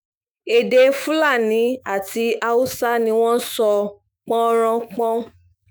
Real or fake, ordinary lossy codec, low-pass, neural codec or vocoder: fake; none; none; autoencoder, 48 kHz, 128 numbers a frame, DAC-VAE, trained on Japanese speech